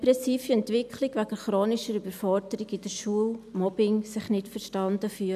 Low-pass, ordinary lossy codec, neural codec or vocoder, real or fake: 14.4 kHz; none; none; real